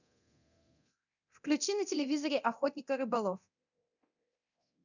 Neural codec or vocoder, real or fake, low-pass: codec, 24 kHz, 0.9 kbps, DualCodec; fake; 7.2 kHz